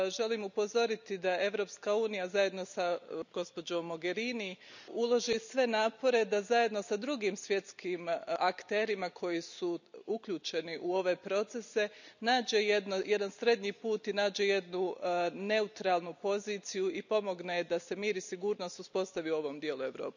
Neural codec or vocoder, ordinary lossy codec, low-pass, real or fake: none; none; 7.2 kHz; real